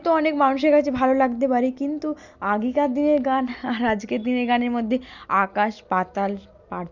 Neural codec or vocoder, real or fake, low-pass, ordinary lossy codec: none; real; 7.2 kHz; Opus, 64 kbps